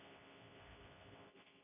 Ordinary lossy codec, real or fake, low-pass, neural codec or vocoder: none; fake; 3.6 kHz; codec, 24 kHz, 0.9 kbps, WavTokenizer, medium speech release version 2